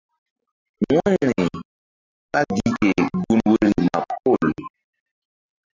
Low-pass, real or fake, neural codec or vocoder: 7.2 kHz; real; none